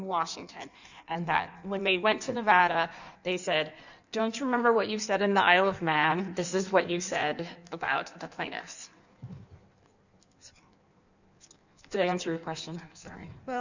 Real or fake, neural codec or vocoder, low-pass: fake; codec, 16 kHz in and 24 kHz out, 1.1 kbps, FireRedTTS-2 codec; 7.2 kHz